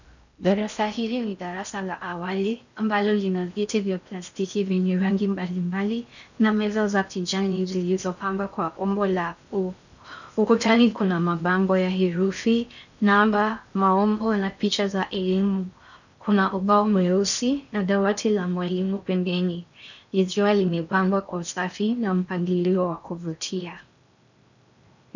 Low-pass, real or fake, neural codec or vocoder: 7.2 kHz; fake; codec, 16 kHz in and 24 kHz out, 0.6 kbps, FocalCodec, streaming, 4096 codes